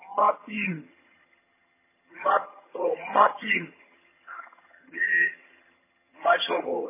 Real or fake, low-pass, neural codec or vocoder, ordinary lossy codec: fake; 3.6 kHz; vocoder, 22.05 kHz, 80 mel bands, HiFi-GAN; MP3, 16 kbps